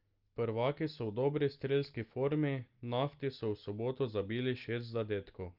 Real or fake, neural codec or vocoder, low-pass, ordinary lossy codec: real; none; 5.4 kHz; Opus, 32 kbps